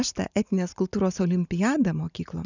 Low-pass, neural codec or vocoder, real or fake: 7.2 kHz; none; real